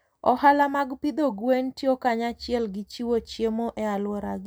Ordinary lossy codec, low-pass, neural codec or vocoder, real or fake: none; none; none; real